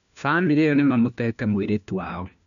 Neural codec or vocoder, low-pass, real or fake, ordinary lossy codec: codec, 16 kHz, 1 kbps, FunCodec, trained on LibriTTS, 50 frames a second; 7.2 kHz; fake; none